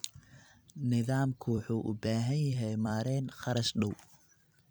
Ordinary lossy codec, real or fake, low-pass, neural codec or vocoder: none; fake; none; vocoder, 44.1 kHz, 128 mel bands every 256 samples, BigVGAN v2